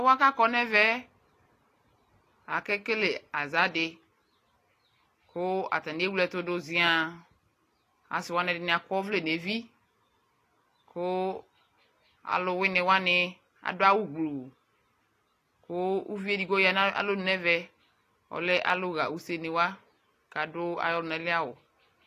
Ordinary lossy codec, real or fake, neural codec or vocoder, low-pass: AAC, 48 kbps; real; none; 14.4 kHz